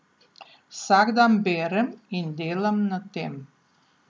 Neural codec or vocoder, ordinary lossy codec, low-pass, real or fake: none; none; none; real